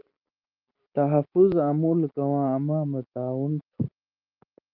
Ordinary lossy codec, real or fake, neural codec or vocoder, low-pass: Opus, 24 kbps; real; none; 5.4 kHz